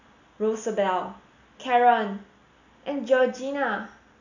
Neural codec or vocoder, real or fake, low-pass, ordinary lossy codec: none; real; 7.2 kHz; none